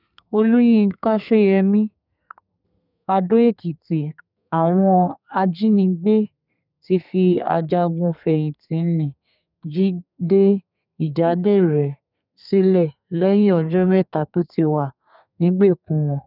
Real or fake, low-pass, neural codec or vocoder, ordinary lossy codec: fake; 5.4 kHz; codec, 32 kHz, 1.9 kbps, SNAC; none